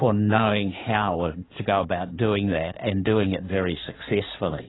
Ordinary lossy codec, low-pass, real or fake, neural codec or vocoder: AAC, 16 kbps; 7.2 kHz; fake; codec, 16 kHz in and 24 kHz out, 2.2 kbps, FireRedTTS-2 codec